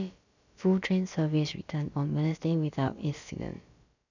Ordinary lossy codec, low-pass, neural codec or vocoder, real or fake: none; 7.2 kHz; codec, 16 kHz, about 1 kbps, DyCAST, with the encoder's durations; fake